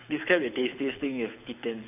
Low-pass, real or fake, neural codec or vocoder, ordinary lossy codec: 3.6 kHz; fake; codec, 24 kHz, 6 kbps, HILCodec; AAC, 32 kbps